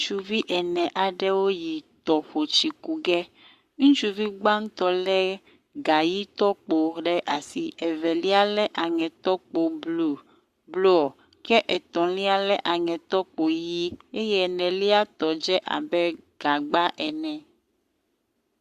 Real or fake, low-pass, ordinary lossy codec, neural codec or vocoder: fake; 14.4 kHz; Opus, 64 kbps; codec, 44.1 kHz, 7.8 kbps, Pupu-Codec